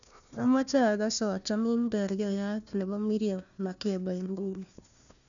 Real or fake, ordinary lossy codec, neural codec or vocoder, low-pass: fake; none; codec, 16 kHz, 1 kbps, FunCodec, trained on Chinese and English, 50 frames a second; 7.2 kHz